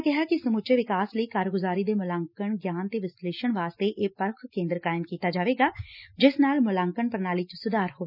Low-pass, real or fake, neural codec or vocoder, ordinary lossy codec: 5.4 kHz; real; none; none